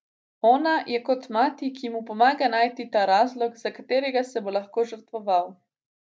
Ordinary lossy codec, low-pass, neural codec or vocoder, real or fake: none; none; none; real